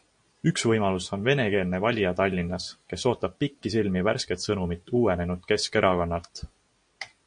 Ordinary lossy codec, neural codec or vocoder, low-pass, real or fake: MP3, 48 kbps; none; 9.9 kHz; real